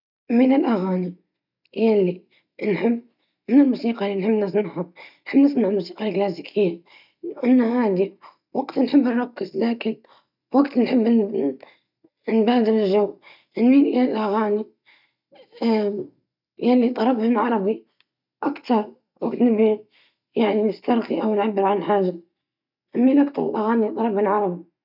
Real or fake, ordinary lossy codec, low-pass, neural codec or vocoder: real; none; 5.4 kHz; none